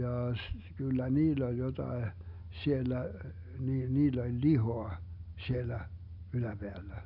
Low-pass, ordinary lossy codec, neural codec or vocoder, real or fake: 5.4 kHz; none; none; real